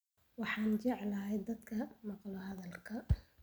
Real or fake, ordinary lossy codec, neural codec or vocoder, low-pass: real; none; none; none